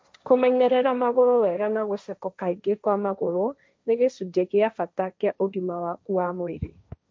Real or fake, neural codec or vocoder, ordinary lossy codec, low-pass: fake; codec, 16 kHz, 1.1 kbps, Voila-Tokenizer; none; none